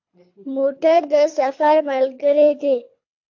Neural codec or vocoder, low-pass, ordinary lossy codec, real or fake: codec, 24 kHz, 3 kbps, HILCodec; 7.2 kHz; AAC, 48 kbps; fake